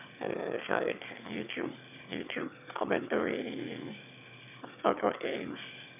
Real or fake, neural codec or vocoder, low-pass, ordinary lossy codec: fake; autoencoder, 22.05 kHz, a latent of 192 numbers a frame, VITS, trained on one speaker; 3.6 kHz; none